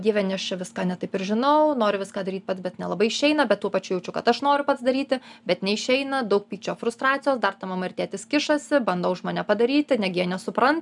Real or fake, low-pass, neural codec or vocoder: real; 10.8 kHz; none